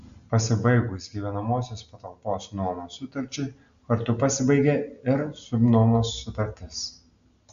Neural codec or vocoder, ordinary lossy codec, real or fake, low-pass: none; AAC, 96 kbps; real; 7.2 kHz